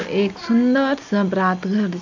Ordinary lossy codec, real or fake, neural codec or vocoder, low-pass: MP3, 64 kbps; real; none; 7.2 kHz